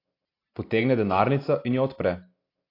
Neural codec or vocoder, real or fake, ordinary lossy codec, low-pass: none; real; AAC, 32 kbps; 5.4 kHz